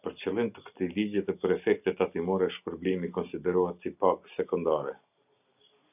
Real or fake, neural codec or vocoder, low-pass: real; none; 3.6 kHz